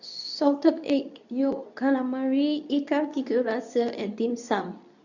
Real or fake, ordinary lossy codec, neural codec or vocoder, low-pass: fake; none; codec, 24 kHz, 0.9 kbps, WavTokenizer, medium speech release version 2; 7.2 kHz